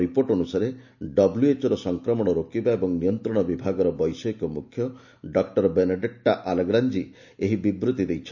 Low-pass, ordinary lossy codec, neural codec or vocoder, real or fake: 7.2 kHz; none; none; real